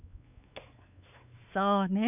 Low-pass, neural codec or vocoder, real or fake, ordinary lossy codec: 3.6 kHz; codec, 16 kHz, 4 kbps, X-Codec, WavLM features, trained on Multilingual LibriSpeech; fake; none